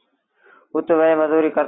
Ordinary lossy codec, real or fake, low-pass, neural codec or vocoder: AAC, 16 kbps; real; 7.2 kHz; none